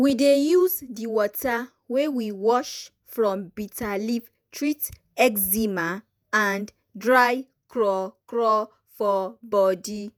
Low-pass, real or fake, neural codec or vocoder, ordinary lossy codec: none; fake; vocoder, 48 kHz, 128 mel bands, Vocos; none